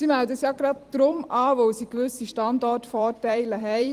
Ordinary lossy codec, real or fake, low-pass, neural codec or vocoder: Opus, 24 kbps; fake; 14.4 kHz; vocoder, 44.1 kHz, 128 mel bands every 256 samples, BigVGAN v2